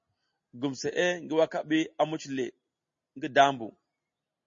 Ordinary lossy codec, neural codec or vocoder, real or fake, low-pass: MP3, 32 kbps; none; real; 7.2 kHz